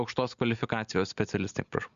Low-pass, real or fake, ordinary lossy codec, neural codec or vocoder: 7.2 kHz; real; Opus, 64 kbps; none